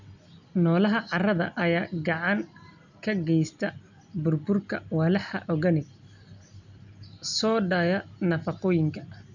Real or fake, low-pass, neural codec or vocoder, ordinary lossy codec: real; 7.2 kHz; none; none